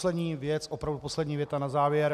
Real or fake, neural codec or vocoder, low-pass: real; none; 14.4 kHz